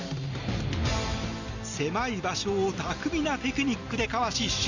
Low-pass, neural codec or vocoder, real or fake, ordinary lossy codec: 7.2 kHz; none; real; none